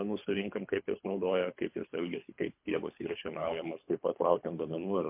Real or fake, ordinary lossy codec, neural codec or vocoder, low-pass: fake; MP3, 32 kbps; codec, 24 kHz, 3 kbps, HILCodec; 3.6 kHz